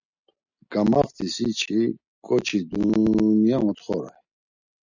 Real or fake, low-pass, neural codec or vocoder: real; 7.2 kHz; none